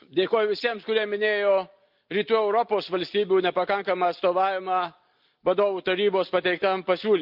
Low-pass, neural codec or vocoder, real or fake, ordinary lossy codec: 5.4 kHz; none; real; Opus, 24 kbps